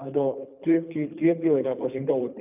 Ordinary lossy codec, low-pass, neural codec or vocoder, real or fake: none; 3.6 kHz; codec, 24 kHz, 3 kbps, HILCodec; fake